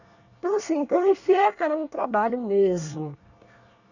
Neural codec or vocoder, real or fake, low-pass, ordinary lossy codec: codec, 24 kHz, 1 kbps, SNAC; fake; 7.2 kHz; none